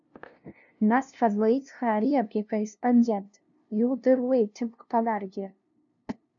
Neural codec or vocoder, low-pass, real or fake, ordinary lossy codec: codec, 16 kHz, 0.5 kbps, FunCodec, trained on LibriTTS, 25 frames a second; 7.2 kHz; fake; MP3, 96 kbps